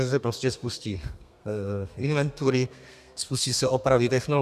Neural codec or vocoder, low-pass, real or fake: codec, 32 kHz, 1.9 kbps, SNAC; 14.4 kHz; fake